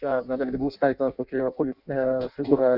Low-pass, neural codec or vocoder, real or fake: 5.4 kHz; codec, 16 kHz in and 24 kHz out, 0.6 kbps, FireRedTTS-2 codec; fake